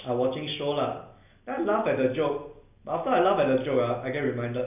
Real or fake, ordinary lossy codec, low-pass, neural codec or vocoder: real; none; 3.6 kHz; none